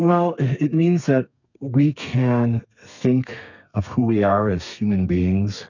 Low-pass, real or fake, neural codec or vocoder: 7.2 kHz; fake; codec, 32 kHz, 1.9 kbps, SNAC